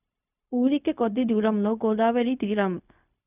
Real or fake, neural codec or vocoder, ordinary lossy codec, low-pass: fake; codec, 16 kHz, 0.4 kbps, LongCat-Audio-Codec; none; 3.6 kHz